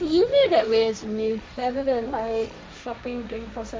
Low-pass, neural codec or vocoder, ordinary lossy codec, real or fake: none; codec, 16 kHz, 1.1 kbps, Voila-Tokenizer; none; fake